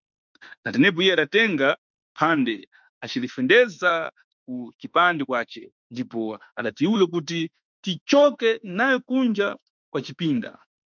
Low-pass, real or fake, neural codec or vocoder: 7.2 kHz; fake; autoencoder, 48 kHz, 32 numbers a frame, DAC-VAE, trained on Japanese speech